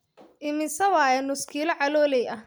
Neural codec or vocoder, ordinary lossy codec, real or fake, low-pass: none; none; real; none